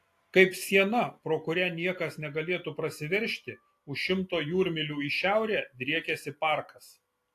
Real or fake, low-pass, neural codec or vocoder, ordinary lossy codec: real; 14.4 kHz; none; AAC, 64 kbps